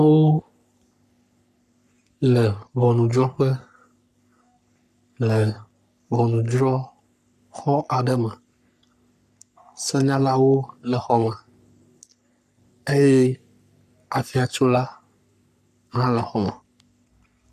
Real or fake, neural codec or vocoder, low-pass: fake; codec, 44.1 kHz, 3.4 kbps, Pupu-Codec; 14.4 kHz